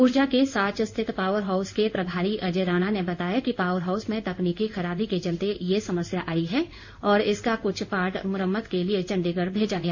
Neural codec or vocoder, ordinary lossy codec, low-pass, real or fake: codec, 16 kHz in and 24 kHz out, 1 kbps, XY-Tokenizer; none; 7.2 kHz; fake